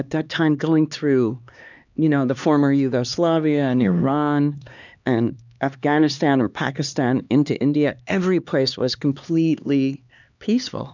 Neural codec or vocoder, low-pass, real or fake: codec, 16 kHz, 2 kbps, X-Codec, HuBERT features, trained on LibriSpeech; 7.2 kHz; fake